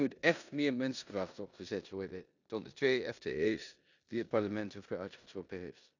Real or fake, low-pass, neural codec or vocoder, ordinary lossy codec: fake; 7.2 kHz; codec, 16 kHz in and 24 kHz out, 0.9 kbps, LongCat-Audio-Codec, four codebook decoder; none